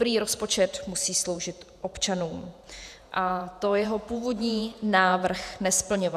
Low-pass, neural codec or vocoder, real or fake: 14.4 kHz; vocoder, 48 kHz, 128 mel bands, Vocos; fake